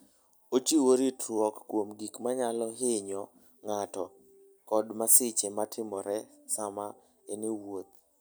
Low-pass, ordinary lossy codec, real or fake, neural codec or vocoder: none; none; real; none